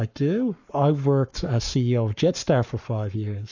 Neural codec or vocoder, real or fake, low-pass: codec, 44.1 kHz, 7.8 kbps, Pupu-Codec; fake; 7.2 kHz